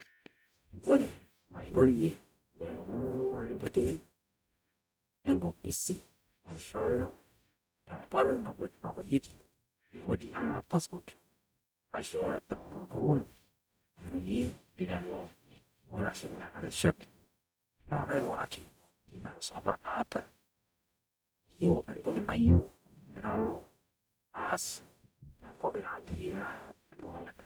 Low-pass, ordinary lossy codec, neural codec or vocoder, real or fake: none; none; codec, 44.1 kHz, 0.9 kbps, DAC; fake